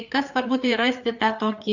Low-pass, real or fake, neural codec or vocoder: 7.2 kHz; fake; codec, 16 kHz, 4 kbps, FreqCodec, larger model